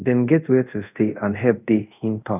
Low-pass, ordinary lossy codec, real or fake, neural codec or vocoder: 3.6 kHz; none; fake; codec, 24 kHz, 0.5 kbps, DualCodec